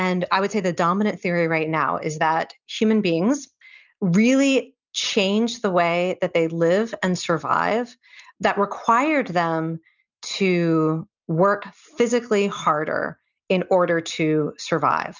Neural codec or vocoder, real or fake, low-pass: none; real; 7.2 kHz